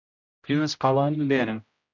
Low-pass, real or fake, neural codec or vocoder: 7.2 kHz; fake; codec, 16 kHz, 0.5 kbps, X-Codec, HuBERT features, trained on general audio